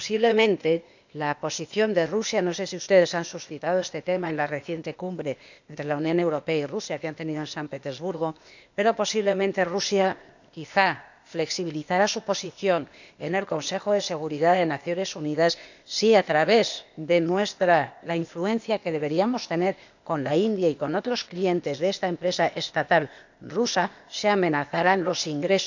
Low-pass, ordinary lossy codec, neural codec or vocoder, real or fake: 7.2 kHz; none; codec, 16 kHz, 0.8 kbps, ZipCodec; fake